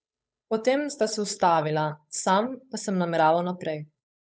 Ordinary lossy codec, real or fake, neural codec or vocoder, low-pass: none; fake; codec, 16 kHz, 8 kbps, FunCodec, trained on Chinese and English, 25 frames a second; none